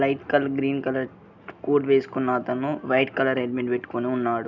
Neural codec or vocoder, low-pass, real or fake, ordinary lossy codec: none; 7.2 kHz; real; none